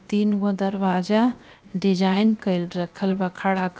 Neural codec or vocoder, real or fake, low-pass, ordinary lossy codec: codec, 16 kHz, 0.7 kbps, FocalCodec; fake; none; none